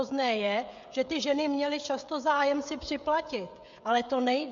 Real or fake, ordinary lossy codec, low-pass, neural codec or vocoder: fake; MP3, 64 kbps; 7.2 kHz; codec, 16 kHz, 16 kbps, FreqCodec, smaller model